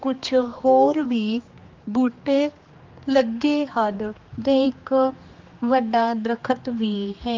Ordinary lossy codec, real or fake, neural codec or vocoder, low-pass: Opus, 32 kbps; fake; codec, 16 kHz, 2 kbps, X-Codec, HuBERT features, trained on general audio; 7.2 kHz